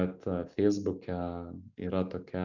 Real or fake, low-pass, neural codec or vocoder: real; 7.2 kHz; none